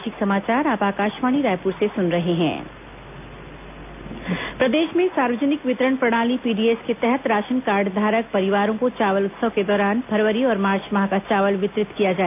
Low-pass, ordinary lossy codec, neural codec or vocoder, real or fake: 3.6 kHz; AAC, 32 kbps; none; real